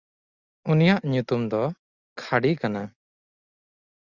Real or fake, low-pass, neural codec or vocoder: real; 7.2 kHz; none